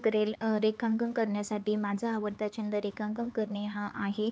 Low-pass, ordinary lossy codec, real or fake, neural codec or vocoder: none; none; fake; codec, 16 kHz, 4 kbps, X-Codec, HuBERT features, trained on LibriSpeech